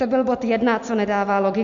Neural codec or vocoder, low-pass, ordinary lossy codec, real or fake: none; 7.2 kHz; MP3, 48 kbps; real